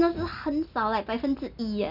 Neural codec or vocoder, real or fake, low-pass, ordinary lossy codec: none; real; 5.4 kHz; none